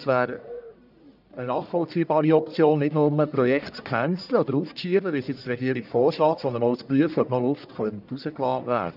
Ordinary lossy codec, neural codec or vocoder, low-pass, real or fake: none; codec, 44.1 kHz, 1.7 kbps, Pupu-Codec; 5.4 kHz; fake